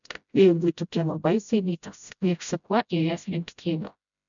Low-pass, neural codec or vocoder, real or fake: 7.2 kHz; codec, 16 kHz, 0.5 kbps, FreqCodec, smaller model; fake